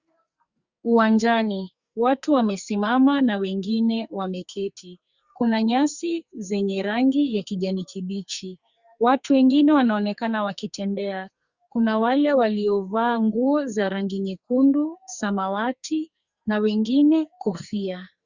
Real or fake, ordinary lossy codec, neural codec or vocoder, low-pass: fake; Opus, 64 kbps; codec, 44.1 kHz, 2.6 kbps, SNAC; 7.2 kHz